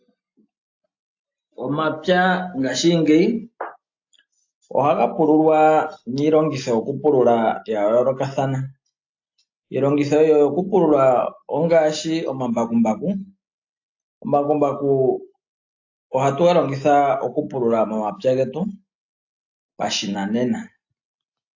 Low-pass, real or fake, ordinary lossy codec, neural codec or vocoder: 7.2 kHz; real; AAC, 48 kbps; none